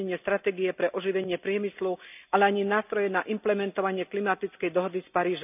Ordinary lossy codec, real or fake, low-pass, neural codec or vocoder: none; real; 3.6 kHz; none